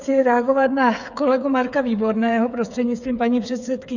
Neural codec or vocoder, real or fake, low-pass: codec, 16 kHz, 8 kbps, FreqCodec, smaller model; fake; 7.2 kHz